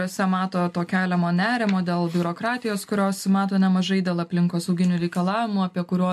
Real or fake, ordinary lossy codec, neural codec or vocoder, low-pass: real; MP3, 64 kbps; none; 14.4 kHz